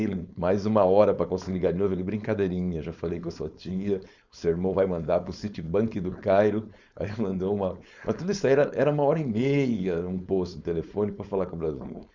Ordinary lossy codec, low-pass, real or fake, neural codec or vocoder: Opus, 64 kbps; 7.2 kHz; fake; codec, 16 kHz, 4.8 kbps, FACodec